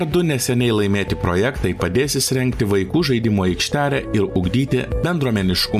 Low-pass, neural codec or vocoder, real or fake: 14.4 kHz; none; real